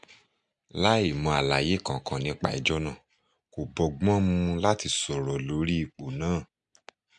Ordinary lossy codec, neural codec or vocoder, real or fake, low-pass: MP3, 96 kbps; none; real; 10.8 kHz